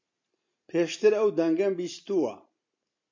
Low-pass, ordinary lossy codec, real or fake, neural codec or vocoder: 7.2 kHz; MP3, 48 kbps; real; none